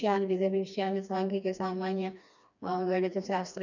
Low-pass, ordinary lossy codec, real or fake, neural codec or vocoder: 7.2 kHz; none; fake; codec, 16 kHz, 2 kbps, FreqCodec, smaller model